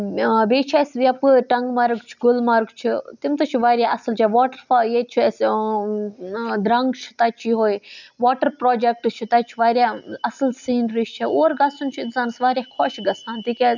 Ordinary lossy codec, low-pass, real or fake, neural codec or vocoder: none; 7.2 kHz; real; none